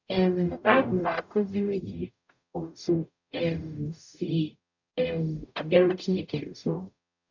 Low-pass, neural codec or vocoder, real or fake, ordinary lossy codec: 7.2 kHz; codec, 44.1 kHz, 0.9 kbps, DAC; fake; none